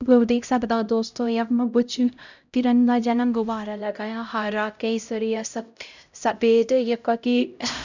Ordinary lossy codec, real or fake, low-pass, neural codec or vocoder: none; fake; 7.2 kHz; codec, 16 kHz, 0.5 kbps, X-Codec, HuBERT features, trained on LibriSpeech